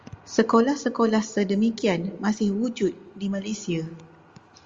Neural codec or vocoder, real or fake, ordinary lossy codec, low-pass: none; real; Opus, 32 kbps; 7.2 kHz